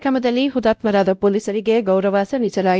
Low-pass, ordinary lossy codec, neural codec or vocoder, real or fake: none; none; codec, 16 kHz, 0.5 kbps, X-Codec, WavLM features, trained on Multilingual LibriSpeech; fake